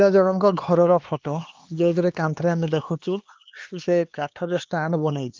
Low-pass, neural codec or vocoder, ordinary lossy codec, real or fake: 7.2 kHz; codec, 16 kHz, 2 kbps, X-Codec, HuBERT features, trained on LibriSpeech; Opus, 32 kbps; fake